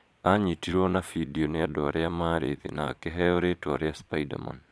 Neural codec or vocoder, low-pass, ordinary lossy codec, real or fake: vocoder, 22.05 kHz, 80 mel bands, Vocos; none; none; fake